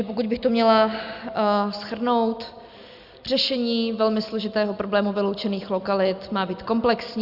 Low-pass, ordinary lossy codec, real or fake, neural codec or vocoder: 5.4 kHz; Opus, 64 kbps; real; none